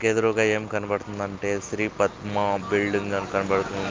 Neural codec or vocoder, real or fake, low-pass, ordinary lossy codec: none; real; 7.2 kHz; Opus, 32 kbps